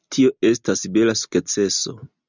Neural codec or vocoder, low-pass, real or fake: none; 7.2 kHz; real